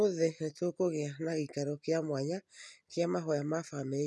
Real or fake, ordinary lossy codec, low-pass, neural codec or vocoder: real; none; none; none